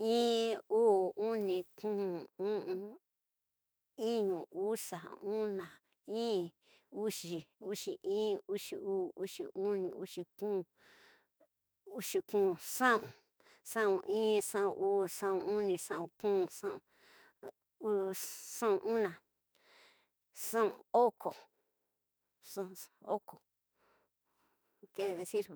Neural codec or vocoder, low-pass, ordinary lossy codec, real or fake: autoencoder, 48 kHz, 32 numbers a frame, DAC-VAE, trained on Japanese speech; none; none; fake